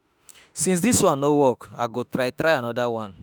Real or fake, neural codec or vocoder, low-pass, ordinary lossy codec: fake; autoencoder, 48 kHz, 32 numbers a frame, DAC-VAE, trained on Japanese speech; none; none